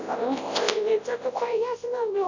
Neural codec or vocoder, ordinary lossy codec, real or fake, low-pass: codec, 24 kHz, 0.9 kbps, WavTokenizer, large speech release; none; fake; 7.2 kHz